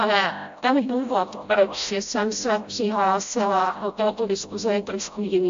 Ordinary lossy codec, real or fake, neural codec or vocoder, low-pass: AAC, 64 kbps; fake; codec, 16 kHz, 0.5 kbps, FreqCodec, smaller model; 7.2 kHz